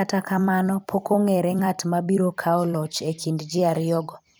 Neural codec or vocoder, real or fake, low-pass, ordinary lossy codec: vocoder, 44.1 kHz, 128 mel bands every 256 samples, BigVGAN v2; fake; none; none